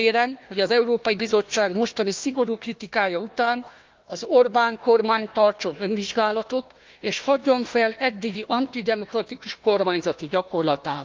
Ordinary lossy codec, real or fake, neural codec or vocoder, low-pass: Opus, 24 kbps; fake; codec, 16 kHz, 1 kbps, FunCodec, trained on Chinese and English, 50 frames a second; 7.2 kHz